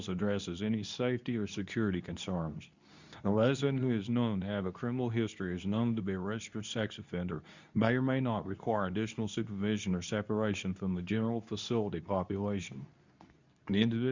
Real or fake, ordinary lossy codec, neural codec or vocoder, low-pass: fake; Opus, 64 kbps; codec, 24 kHz, 0.9 kbps, WavTokenizer, medium speech release version 2; 7.2 kHz